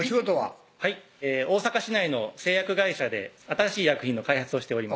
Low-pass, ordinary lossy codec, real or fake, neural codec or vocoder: none; none; real; none